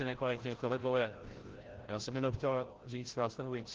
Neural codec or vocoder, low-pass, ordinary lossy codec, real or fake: codec, 16 kHz, 0.5 kbps, FreqCodec, larger model; 7.2 kHz; Opus, 16 kbps; fake